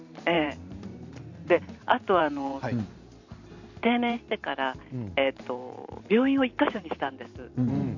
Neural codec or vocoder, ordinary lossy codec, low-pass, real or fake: vocoder, 44.1 kHz, 128 mel bands every 512 samples, BigVGAN v2; none; 7.2 kHz; fake